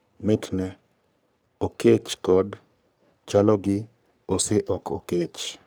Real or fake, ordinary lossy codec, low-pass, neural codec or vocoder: fake; none; none; codec, 44.1 kHz, 3.4 kbps, Pupu-Codec